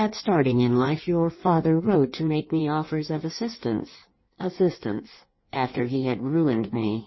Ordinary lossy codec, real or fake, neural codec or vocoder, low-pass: MP3, 24 kbps; fake; codec, 16 kHz in and 24 kHz out, 1.1 kbps, FireRedTTS-2 codec; 7.2 kHz